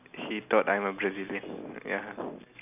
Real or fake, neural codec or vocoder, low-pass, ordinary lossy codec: real; none; 3.6 kHz; none